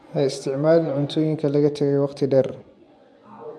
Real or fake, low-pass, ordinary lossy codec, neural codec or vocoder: fake; none; none; vocoder, 24 kHz, 100 mel bands, Vocos